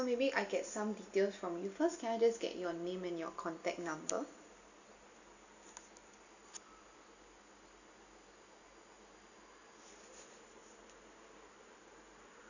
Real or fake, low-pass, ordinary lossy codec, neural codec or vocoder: real; 7.2 kHz; none; none